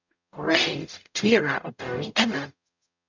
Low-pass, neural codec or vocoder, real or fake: 7.2 kHz; codec, 44.1 kHz, 0.9 kbps, DAC; fake